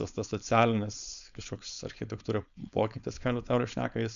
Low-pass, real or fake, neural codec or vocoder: 7.2 kHz; fake; codec, 16 kHz, 4.8 kbps, FACodec